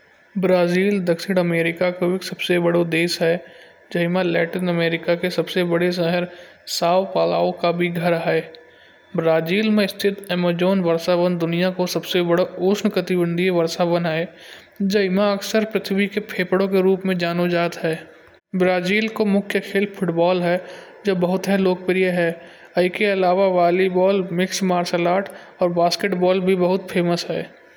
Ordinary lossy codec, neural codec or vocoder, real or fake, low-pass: none; none; real; none